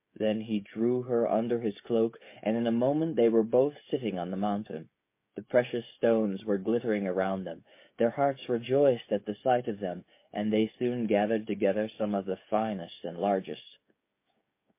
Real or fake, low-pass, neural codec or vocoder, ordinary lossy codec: fake; 3.6 kHz; codec, 16 kHz, 16 kbps, FreqCodec, smaller model; MP3, 24 kbps